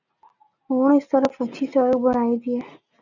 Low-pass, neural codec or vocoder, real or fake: 7.2 kHz; none; real